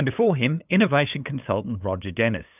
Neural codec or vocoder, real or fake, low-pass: codec, 16 kHz, 8 kbps, FunCodec, trained on LibriTTS, 25 frames a second; fake; 3.6 kHz